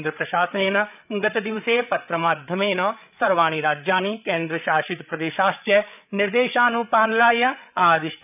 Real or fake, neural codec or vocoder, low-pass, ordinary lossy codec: fake; codec, 16 kHz, 8 kbps, FreqCodec, larger model; 3.6 kHz; none